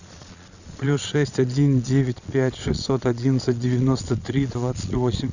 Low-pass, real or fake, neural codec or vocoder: 7.2 kHz; fake; vocoder, 22.05 kHz, 80 mel bands, Vocos